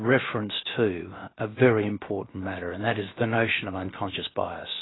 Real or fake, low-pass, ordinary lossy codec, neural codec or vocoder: fake; 7.2 kHz; AAC, 16 kbps; codec, 16 kHz, about 1 kbps, DyCAST, with the encoder's durations